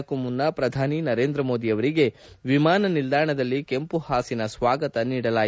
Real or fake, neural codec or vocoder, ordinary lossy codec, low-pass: real; none; none; none